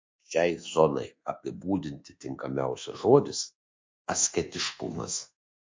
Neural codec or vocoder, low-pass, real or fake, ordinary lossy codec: codec, 24 kHz, 1.2 kbps, DualCodec; 7.2 kHz; fake; MP3, 48 kbps